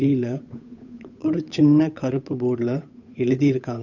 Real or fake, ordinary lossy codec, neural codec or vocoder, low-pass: fake; none; codec, 16 kHz, 8 kbps, FunCodec, trained on Chinese and English, 25 frames a second; 7.2 kHz